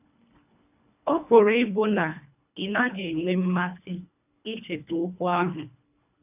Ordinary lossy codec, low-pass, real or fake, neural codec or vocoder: none; 3.6 kHz; fake; codec, 24 kHz, 1.5 kbps, HILCodec